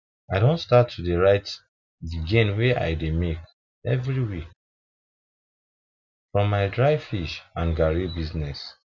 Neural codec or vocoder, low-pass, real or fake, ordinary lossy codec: none; 7.2 kHz; real; none